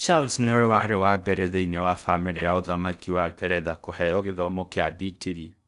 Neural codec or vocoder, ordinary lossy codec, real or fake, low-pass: codec, 16 kHz in and 24 kHz out, 0.6 kbps, FocalCodec, streaming, 4096 codes; none; fake; 10.8 kHz